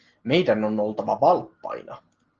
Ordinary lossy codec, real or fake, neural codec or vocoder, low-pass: Opus, 16 kbps; real; none; 7.2 kHz